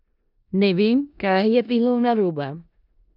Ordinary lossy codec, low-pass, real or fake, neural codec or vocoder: none; 5.4 kHz; fake; codec, 16 kHz in and 24 kHz out, 0.4 kbps, LongCat-Audio-Codec, four codebook decoder